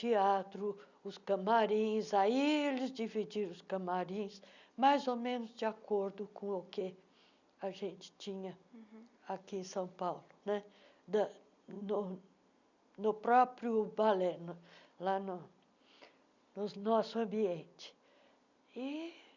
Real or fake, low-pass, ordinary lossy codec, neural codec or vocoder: real; 7.2 kHz; none; none